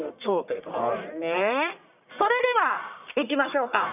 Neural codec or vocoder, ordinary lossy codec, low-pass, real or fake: codec, 44.1 kHz, 1.7 kbps, Pupu-Codec; none; 3.6 kHz; fake